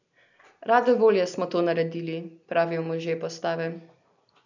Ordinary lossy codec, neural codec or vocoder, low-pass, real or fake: none; none; 7.2 kHz; real